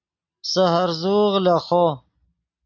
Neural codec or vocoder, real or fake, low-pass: none; real; 7.2 kHz